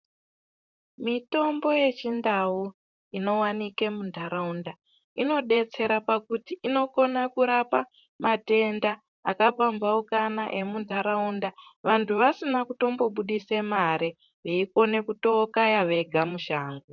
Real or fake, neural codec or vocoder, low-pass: fake; vocoder, 44.1 kHz, 128 mel bands, Pupu-Vocoder; 7.2 kHz